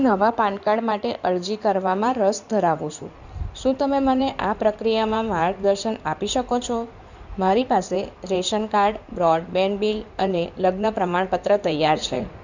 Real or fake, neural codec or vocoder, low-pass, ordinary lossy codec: fake; codec, 16 kHz in and 24 kHz out, 2.2 kbps, FireRedTTS-2 codec; 7.2 kHz; none